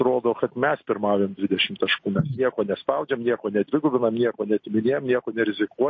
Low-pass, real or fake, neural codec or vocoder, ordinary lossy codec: 7.2 kHz; real; none; MP3, 32 kbps